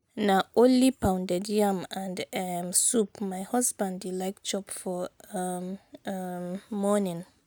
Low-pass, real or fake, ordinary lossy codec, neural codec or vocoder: none; real; none; none